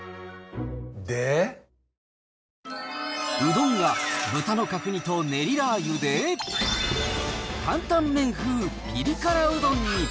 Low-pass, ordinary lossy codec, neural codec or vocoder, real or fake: none; none; none; real